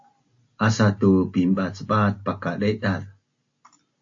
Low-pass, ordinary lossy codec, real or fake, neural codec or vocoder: 7.2 kHz; AAC, 48 kbps; real; none